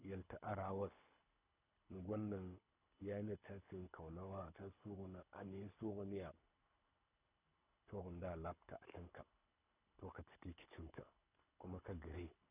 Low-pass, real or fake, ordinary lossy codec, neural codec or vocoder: 3.6 kHz; fake; none; codec, 24 kHz, 6 kbps, HILCodec